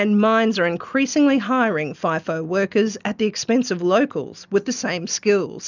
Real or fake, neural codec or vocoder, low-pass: real; none; 7.2 kHz